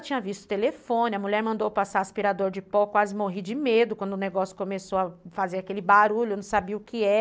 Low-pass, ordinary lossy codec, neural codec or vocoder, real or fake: none; none; none; real